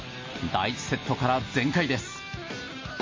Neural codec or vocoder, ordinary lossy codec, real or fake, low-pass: none; MP3, 32 kbps; real; 7.2 kHz